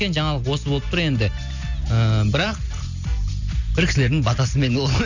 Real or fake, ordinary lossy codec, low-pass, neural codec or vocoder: real; none; 7.2 kHz; none